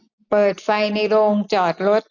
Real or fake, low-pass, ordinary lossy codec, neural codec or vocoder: real; 7.2 kHz; none; none